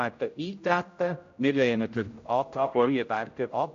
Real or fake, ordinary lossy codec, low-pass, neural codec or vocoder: fake; AAC, 48 kbps; 7.2 kHz; codec, 16 kHz, 0.5 kbps, X-Codec, HuBERT features, trained on general audio